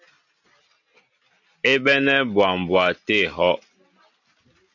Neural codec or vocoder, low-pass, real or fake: none; 7.2 kHz; real